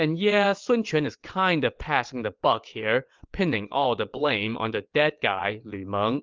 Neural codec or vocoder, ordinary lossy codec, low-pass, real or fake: vocoder, 22.05 kHz, 80 mel bands, WaveNeXt; Opus, 24 kbps; 7.2 kHz; fake